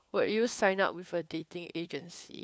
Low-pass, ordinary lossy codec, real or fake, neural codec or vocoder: none; none; fake; codec, 16 kHz, 4 kbps, FunCodec, trained on LibriTTS, 50 frames a second